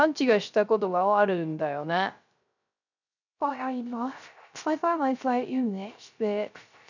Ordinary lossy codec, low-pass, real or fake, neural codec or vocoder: none; 7.2 kHz; fake; codec, 16 kHz, 0.3 kbps, FocalCodec